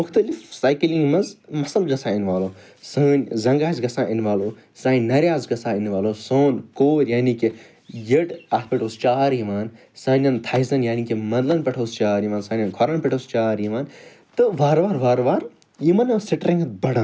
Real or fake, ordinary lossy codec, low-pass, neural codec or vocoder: real; none; none; none